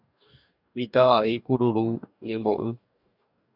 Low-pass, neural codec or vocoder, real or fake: 5.4 kHz; codec, 44.1 kHz, 2.6 kbps, DAC; fake